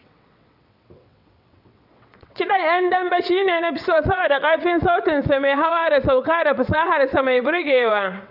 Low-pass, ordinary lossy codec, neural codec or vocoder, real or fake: 5.4 kHz; AAC, 48 kbps; vocoder, 22.05 kHz, 80 mel bands, WaveNeXt; fake